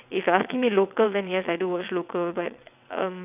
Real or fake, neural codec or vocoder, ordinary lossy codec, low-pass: fake; vocoder, 22.05 kHz, 80 mel bands, WaveNeXt; none; 3.6 kHz